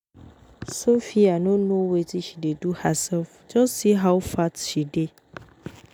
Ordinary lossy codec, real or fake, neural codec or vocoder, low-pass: none; real; none; none